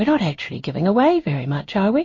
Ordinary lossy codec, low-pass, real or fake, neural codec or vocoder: MP3, 32 kbps; 7.2 kHz; fake; codec, 16 kHz in and 24 kHz out, 1 kbps, XY-Tokenizer